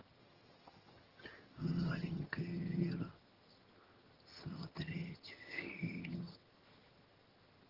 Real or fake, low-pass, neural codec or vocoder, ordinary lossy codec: fake; 5.4 kHz; vocoder, 22.05 kHz, 80 mel bands, HiFi-GAN; Opus, 16 kbps